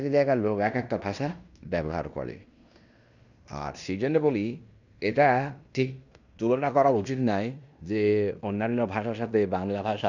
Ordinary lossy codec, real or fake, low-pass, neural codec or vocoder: none; fake; 7.2 kHz; codec, 16 kHz in and 24 kHz out, 0.9 kbps, LongCat-Audio-Codec, fine tuned four codebook decoder